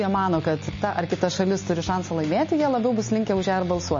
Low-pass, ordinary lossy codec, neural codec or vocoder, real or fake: 7.2 kHz; MP3, 32 kbps; none; real